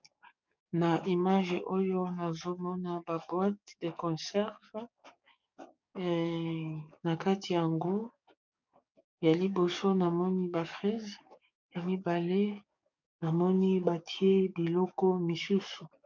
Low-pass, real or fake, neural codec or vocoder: 7.2 kHz; fake; codec, 44.1 kHz, 7.8 kbps, DAC